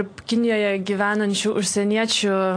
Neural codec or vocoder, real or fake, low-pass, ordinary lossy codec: none; real; 9.9 kHz; AAC, 48 kbps